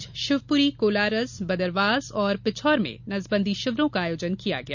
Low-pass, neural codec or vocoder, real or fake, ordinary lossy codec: 7.2 kHz; none; real; none